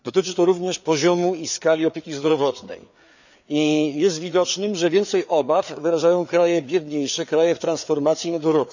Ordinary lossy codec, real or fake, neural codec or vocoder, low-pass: none; fake; codec, 16 kHz, 4 kbps, FreqCodec, larger model; 7.2 kHz